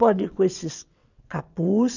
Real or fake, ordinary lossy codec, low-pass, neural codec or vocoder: fake; none; 7.2 kHz; vocoder, 22.05 kHz, 80 mel bands, WaveNeXt